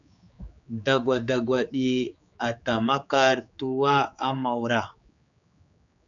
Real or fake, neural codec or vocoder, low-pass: fake; codec, 16 kHz, 4 kbps, X-Codec, HuBERT features, trained on general audio; 7.2 kHz